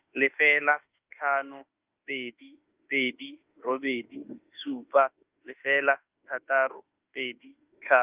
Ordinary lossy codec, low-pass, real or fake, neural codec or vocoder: Opus, 24 kbps; 3.6 kHz; fake; autoencoder, 48 kHz, 32 numbers a frame, DAC-VAE, trained on Japanese speech